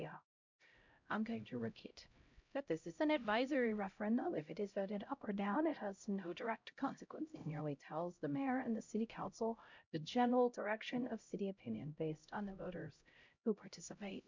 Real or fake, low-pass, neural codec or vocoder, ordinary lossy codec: fake; 7.2 kHz; codec, 16 kHz, 0.5 kbps, X-Codec, HuBERT features, trained on LibriSpeech; MP3, 64 kbps